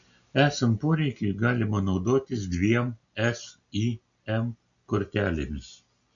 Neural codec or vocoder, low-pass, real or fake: none; 7.2 kHz; real